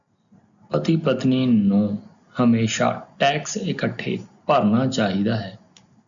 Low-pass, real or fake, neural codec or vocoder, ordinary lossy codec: 7.2 kHz; real; none; MP3, 96 kbps